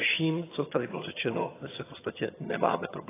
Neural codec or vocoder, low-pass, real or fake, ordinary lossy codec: vocoder, 22.05 kHz, 80 mel bands, HiFi-GAN; 3.6 kHz; fake; AAC, 16 kbps